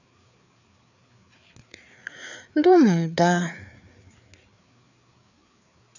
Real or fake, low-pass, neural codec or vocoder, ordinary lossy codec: fake; 7.2 kHz; codec, 16 kHz, 4 kbps, FreqCodec, larger model; none